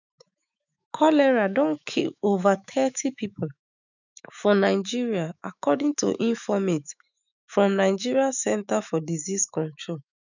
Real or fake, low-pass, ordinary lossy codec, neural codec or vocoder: fake; 7.2 kHz; none; autoencoder, 48 kHz, 128 numbers a frame, DAC-VAE, trained on Japanese speech